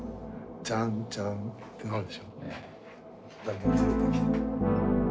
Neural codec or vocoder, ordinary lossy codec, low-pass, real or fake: none; none; none; real